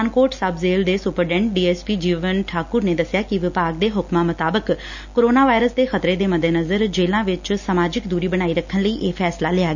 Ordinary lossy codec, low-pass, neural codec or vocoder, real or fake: none; 7.2 kHz; none; real